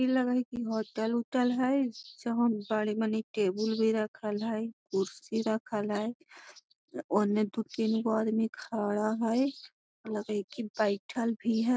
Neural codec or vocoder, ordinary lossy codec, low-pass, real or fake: none; none; none; real